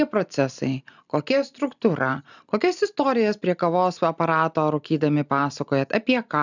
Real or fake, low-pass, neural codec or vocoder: real; 7.2 kHz; none